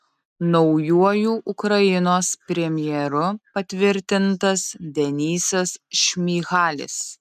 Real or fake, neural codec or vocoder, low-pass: real; none; 14.4 kHz